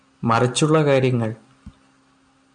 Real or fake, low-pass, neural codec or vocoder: real; 9.9 kHz; none